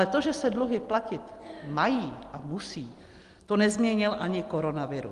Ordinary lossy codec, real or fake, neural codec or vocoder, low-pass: Opus, 24 kbps; real; none; 10.8 kHz